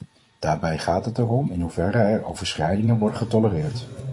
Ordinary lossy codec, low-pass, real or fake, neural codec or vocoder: MP3, 48 kbps; 10.8 kHz; fake; vocoder, 24 kHz, 100 mel bands, Vocos